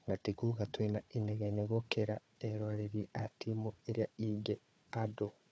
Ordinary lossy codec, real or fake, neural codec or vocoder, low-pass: none; fake; codec, 16 kHz, 4 kbps, FunCodec, trained on Chinese and English, 50 frames a second; none